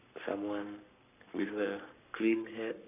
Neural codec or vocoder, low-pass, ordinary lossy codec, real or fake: codec, 44.1 kHz, 7.8 kbps, Pupu-Codec; 3.6 kHz; none; fake